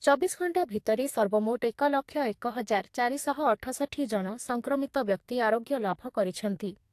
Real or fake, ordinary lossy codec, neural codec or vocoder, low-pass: fake; AAC, 64 kbps; codec, 44.1 kHz, 2.6 kbps, SNAC; 14.4 kHz